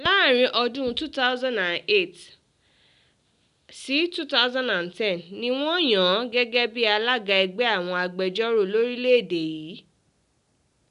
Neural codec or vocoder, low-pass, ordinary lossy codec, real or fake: none; 10.8 kHz; none; real